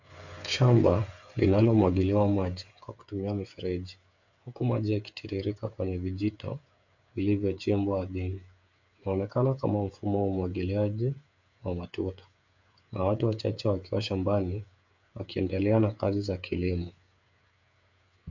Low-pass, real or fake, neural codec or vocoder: 7.2 kHz; fake; codec, 16 kHz, 8 kbps, FreqCodec, smaller model